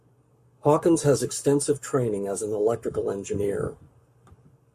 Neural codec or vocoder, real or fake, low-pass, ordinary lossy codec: codec, 44.1 kHz, 7.8 kbps, Pupu-Codec; fake; 14.4 kHz; MP3, 64 kbps